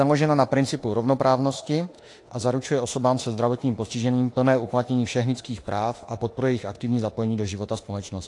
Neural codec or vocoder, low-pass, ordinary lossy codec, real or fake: autoencoder, 48 kHz, 32 numbers a frame, DAC-VAE, trained on Japanese speech; 10.8 kHz; AAC, 48 kbps; fake